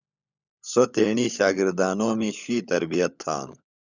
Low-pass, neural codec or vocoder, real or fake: 7.2 kHz; codec, 16 kHz, 16 kbps, FunCodec, trained on LibriTTS, 50 frames a second; fake